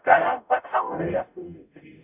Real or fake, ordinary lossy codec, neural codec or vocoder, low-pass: fake; none; codec, 44.1 kHz, 0.9 kbps, DAC; 3.6 kHz